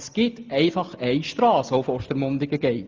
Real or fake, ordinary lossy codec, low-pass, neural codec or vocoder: real; Opus, 24 kbps; 7.2 kHz; none